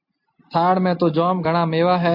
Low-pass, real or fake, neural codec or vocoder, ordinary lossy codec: 5.4 kHz; real; none; AAC, 48 kbps